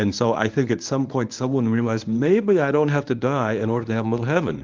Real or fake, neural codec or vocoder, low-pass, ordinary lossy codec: fake; codec, 24 kHz, 0.9 kbps, WavTokenizer, medium speech release version 1; 7.2 kHz; Opus, 32 kbps